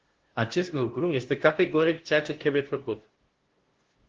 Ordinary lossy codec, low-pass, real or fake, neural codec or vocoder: Opus, 16 kbps; 7.2 kHz; fake; codec, 16 kHz, 0.5 kbps, FunCodec, trained on LibriTTS, 25 frames a second